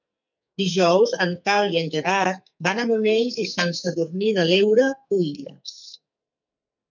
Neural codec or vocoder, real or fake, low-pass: codec, 44.1 kHz, 2.6 kbps, SNAC; fake; 7.2 kHz